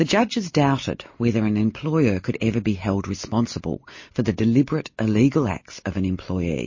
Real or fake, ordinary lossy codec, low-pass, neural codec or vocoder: real; MP3, 32 kbps; 7.2 kHz; none